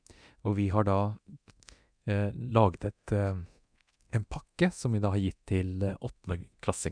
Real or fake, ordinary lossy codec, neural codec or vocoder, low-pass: fake; none; codec, 24 kHz, 0.9 kbps, DualCodec; 9.9 kHz